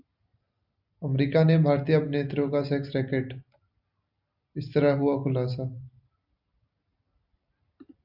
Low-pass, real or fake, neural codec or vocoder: 5.4 kHz; real; none